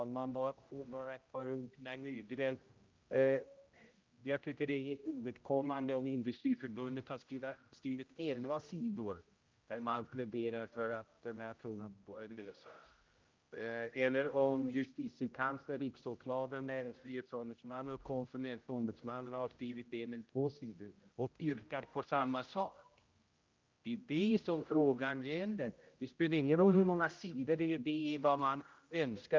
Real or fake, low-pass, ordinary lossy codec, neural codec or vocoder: fake; 7.2 kHz; Opus, 24 kbps; codec, 16 kHz, 0.5 kbps, X-Codec, HuBERT features, trained on general audio